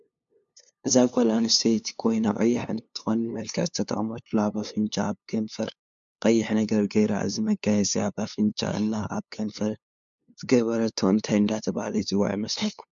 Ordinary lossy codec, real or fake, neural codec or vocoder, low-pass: MP3, 64 kbps; fake; codec, 16 kHz, 2 kbps, FunCodec, trained on LibriTTS, 25 frames a second; 7.2 kHz